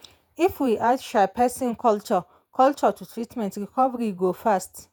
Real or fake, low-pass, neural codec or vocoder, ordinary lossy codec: fake; none; vocoder, 48 kHz, 128 mel bands, Vocos; none